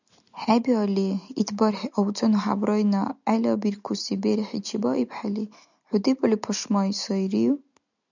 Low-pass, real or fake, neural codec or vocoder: 7.2 kHz; real; none